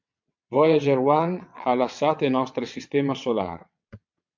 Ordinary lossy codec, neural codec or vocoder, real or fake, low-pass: MP3, 64 kbps; vocoder, 22.05 kHz, 80 mel bands, WaveNeXt; fake; 7.2 kHz